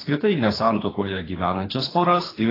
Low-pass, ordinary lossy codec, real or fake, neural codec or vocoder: 5.4 kHz; AAC, 24 kbps; fake; codec, 24 kHz, 3 kbps, HILCodec